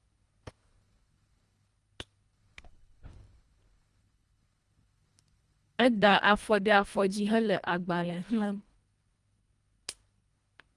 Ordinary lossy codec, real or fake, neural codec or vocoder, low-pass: Opus, 32 kbps; fake; codec, 24 kHz, 1.5 kbps, HILCodec; 10.8 kHz